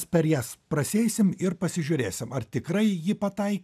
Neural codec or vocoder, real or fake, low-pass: none; real; 14.4 kHz